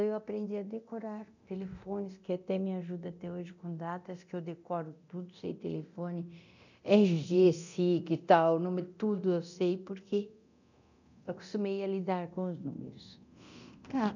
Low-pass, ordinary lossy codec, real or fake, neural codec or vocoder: 7.2 kHz; none; fake; codec, 24 kHz, 0.9 kbps, DualCodec